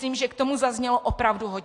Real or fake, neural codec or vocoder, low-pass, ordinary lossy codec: real; none; 10.8 kHz; AAC, 48 kbps